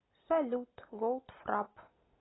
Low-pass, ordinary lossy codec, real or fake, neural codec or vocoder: 7.2 kHz; AAC, 16 kbps; real; none